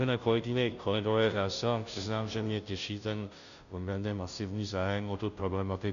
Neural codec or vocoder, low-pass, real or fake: codec, 16 kHz, 0.5 kbps, FunCodec, trained on Chinese and English, 25 frames a second; 7.2 kHz; fake